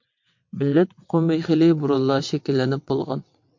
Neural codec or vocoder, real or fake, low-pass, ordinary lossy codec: vocoder, 22.05 kHz, 80 mel bands, WaveNeXt; fake; 7.2 kHz; MP3, 48 kbps